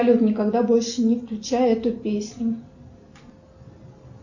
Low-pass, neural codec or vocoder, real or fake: 7.2 kHz; none; real